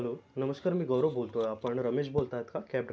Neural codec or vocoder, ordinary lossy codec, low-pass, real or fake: none; none; none; real